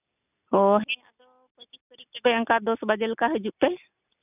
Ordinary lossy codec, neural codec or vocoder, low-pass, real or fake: none; none; 3.6 kHz; real